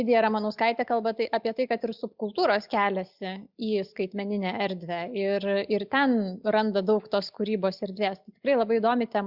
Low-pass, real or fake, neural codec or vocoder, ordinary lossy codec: 5.4 kHz; real; none; Opus, 64 kbps